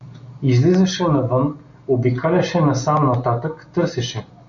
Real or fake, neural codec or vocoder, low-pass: real; none; 7.2 kHz